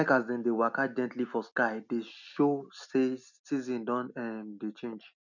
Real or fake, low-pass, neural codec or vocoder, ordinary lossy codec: real; 7.2 kHz; none; none